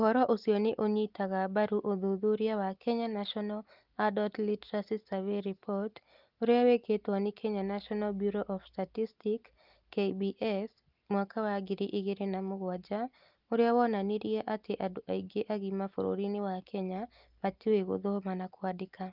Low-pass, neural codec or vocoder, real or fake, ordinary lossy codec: 5.4 kHz; none; real; Opus, 32 kbps